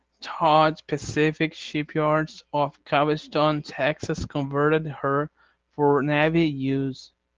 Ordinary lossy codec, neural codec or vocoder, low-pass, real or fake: Opus, 16 kbps; none; 7.2 kHz; real